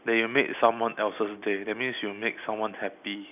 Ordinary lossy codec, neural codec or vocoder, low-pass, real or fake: none; none; 3.6 kHz; real